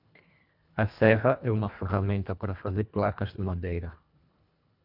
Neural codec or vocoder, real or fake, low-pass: codec, 24 kHz, 1.5 kbps, HILCodec; fake; 5.4 kHz